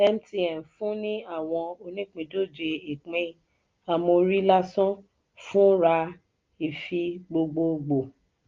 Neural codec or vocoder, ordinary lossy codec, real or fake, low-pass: none; Opus, 32 kbps; real; 7.2 kHz